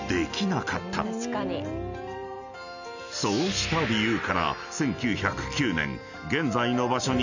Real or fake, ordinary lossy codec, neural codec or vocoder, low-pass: real; none; none; 7.2 kHz